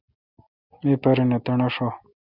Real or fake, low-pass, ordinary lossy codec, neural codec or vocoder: real; 5.4 kHz; Opus, 64 kbps; none